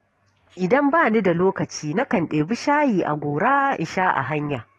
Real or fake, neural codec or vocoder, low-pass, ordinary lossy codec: fake; codec, 44.1 kHz, 7.8 kbps, DAC; 19.8 kHz; AAC, 32 kbps